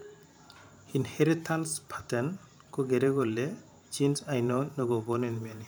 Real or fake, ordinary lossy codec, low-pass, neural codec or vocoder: fake; none; none; vocoder, 44.1 kHz, 128 mel bands every 512 samples, BigVGAN v2